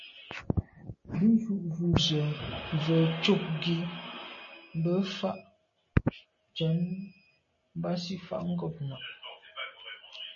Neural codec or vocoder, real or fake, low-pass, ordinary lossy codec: none; real; 7.2 kHz; MP3, 32 kbps